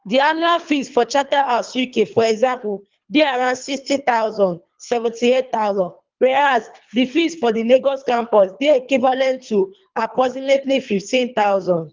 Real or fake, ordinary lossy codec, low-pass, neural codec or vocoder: fake; Opus, 32 kbps; 7.2 kHz; codec, 24 kHz, 3 kbps, HILCodec